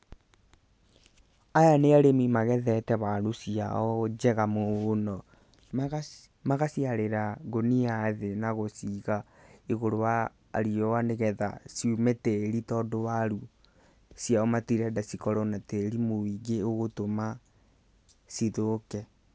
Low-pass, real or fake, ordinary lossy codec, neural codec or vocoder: none; real; none; none